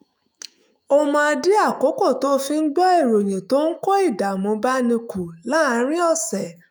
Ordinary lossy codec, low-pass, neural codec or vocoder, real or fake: none; none; autoencoder, 48 kHz, 128 numbers a frame, DAC-VAE, trained on Japanese speech; fake